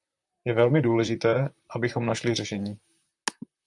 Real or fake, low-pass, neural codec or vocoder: fake; 10.8 kHz; vocoder, 44.1 kHz, 128 mel bands, Pupu-Vocoder